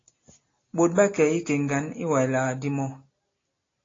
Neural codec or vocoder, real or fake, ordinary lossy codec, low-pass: none; real; AAC, 32 kbps; 7.2 kHz